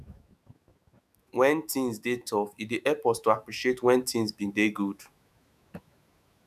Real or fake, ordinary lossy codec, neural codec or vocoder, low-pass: fake; none; autoencoder, 48 kHz, 128 numbers a frame, DAC-VAE, trained on Japanese speech; 14.4 kHz